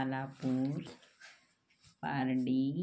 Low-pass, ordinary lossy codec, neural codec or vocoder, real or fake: none; none; none; real